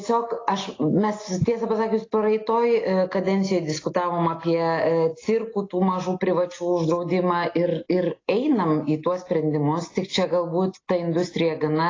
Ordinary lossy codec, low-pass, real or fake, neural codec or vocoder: AAC, 32 kbps; 7.2 kHz; real; none